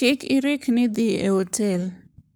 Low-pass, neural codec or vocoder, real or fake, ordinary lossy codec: none; codec, 44.1 kHz, 7.8 kbps, Pupu-Codec; fake; none